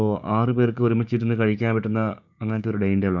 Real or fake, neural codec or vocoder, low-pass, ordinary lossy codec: fake; codec, 16 kHz, 6 kbps, DAC; 7.2 kHz; none